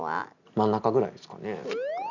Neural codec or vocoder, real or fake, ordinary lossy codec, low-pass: none; real; none; 7.2 kHz